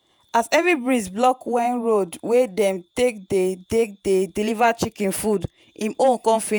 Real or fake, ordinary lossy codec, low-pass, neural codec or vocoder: fake; none; none; vocoder, 48 kHz, 128 mel bands, Vocos